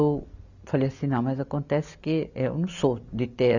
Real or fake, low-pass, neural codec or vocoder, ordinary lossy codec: real; 7.2 kHz; none; none